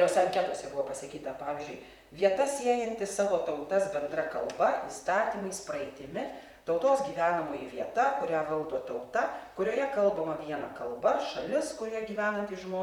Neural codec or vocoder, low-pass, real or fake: vocoder, 44.1 kHz, 128 mel bands, Pupu-Vocoder; 19.8 kHz; fake